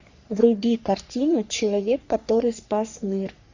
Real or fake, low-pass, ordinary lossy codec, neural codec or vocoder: fake; 7.2 kHz; Opus, 64 kbps; codec, 44.1 kHz, 3.4 kbps, Pupu-Codec